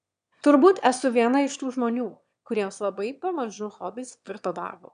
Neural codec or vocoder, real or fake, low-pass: autoencoder, 22.05 kHz, a latent of 192 numbers a frame, VITS, trained on one speaker; fake; 9.9 kHz